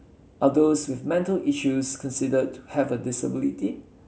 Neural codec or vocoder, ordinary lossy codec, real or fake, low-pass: none; none; real; none